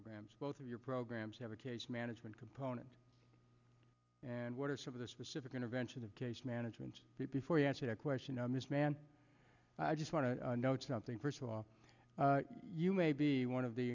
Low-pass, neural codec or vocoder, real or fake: 7.2 kHz; none; real